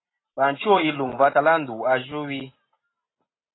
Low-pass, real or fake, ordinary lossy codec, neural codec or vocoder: 7.2 kHz; real; AAC, 16 kbps; none